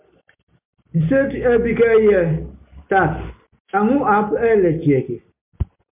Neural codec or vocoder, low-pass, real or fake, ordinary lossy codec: none; 3.6 kHz; real; MP3, 32 kbps